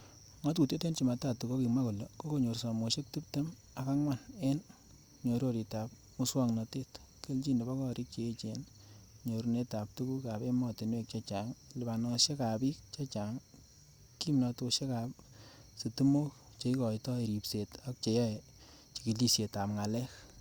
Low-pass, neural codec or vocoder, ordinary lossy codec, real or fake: 19.8 kHz; none; Opus, 64 kbps; real